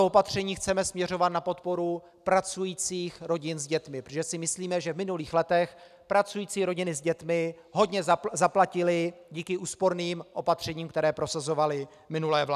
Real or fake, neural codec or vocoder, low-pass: real; none; 14.4 kHz